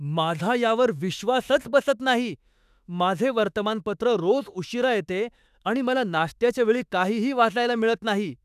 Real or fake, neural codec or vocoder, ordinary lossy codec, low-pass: fake; autoencoder, 48 kHz, 32 numbers a frame, DAC-VAE, trained on Japanese speech; none; 14.4 kHz